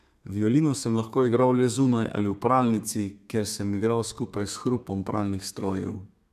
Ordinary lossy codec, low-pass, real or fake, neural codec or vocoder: none; 14.4 kHz; fake; codec, 32 kHz, 1.9 kbps, SNAC